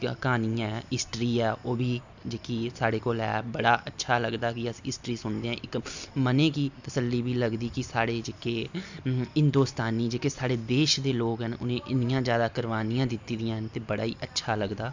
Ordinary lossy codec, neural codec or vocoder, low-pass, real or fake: Opus, 64 kbps; none; 7.2 kHz; real